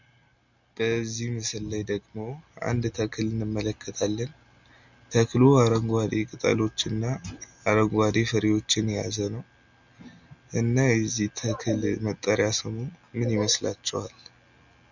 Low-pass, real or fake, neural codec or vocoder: 7.2 kHz; real; none